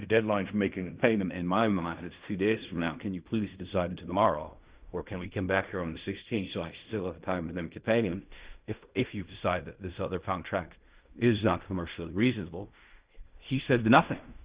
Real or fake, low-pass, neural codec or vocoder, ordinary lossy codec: fake; 3.6 kHz; codec, 16 kHz in and 24 kHz out, 0.4 kbps, LongCat-Audio-Codec, fine tuned four codebook decoder; Opus, 64 kbps